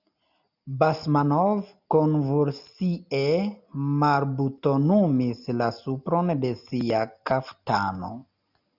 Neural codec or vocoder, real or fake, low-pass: none; real; 5.4 kHz